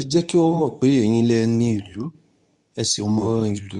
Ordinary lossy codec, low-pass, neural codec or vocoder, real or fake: MP3, 64 kbps; 10.8 kHz; codec, 24 kHz, 0.9 kbps, WavTokenizer, medium speech release version 2; fake